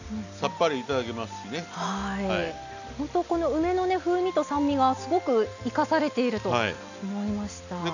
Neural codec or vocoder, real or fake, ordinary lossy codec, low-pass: none; real; none; 7.2 kHz